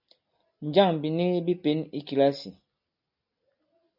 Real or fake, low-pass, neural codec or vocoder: real; 5.4 kHz; none